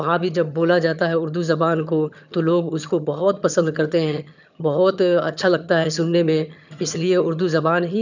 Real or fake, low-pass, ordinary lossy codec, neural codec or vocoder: fake; 7.2 kHz; none; vocoder, 22.05 kHz, 80 mel bands, HiFi-GAN